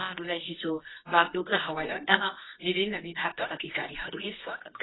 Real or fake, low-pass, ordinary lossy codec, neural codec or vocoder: fake; 7.2 kHz; AAC, 16 kbps; codec, 24 kHz, 0.9 kbps, WavTokenizer, medium music audio release